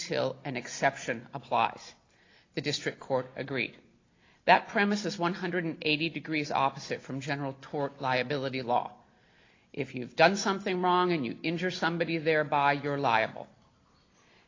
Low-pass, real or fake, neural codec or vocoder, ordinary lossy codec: 7.2 kHz; real; none; AAC, 32 kbps